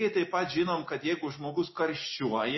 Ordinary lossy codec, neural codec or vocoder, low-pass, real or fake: MP3, 24 kbps; none; 7.2 kHz; real